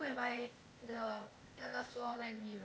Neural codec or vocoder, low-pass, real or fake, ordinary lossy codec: codec, 16 kHz, 0.8 kbps, ZipCodec; none; fake; none